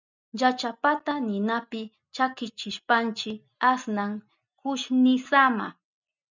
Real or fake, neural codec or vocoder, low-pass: real; none; 7.2 kHz